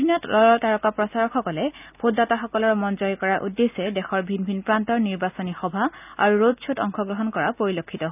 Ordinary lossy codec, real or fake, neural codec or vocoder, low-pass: none; real; none; 3.6 kHz